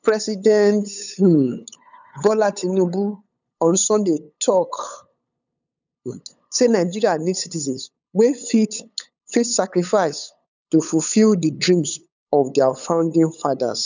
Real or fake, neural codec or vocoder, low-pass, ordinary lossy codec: fake; codec, 16 kHz, 8 kbps, FunCodec, trained on LibriTTS, 25 frames a second; 7.2 kHz; none